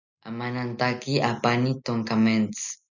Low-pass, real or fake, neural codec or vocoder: 7.2 kHz; real; none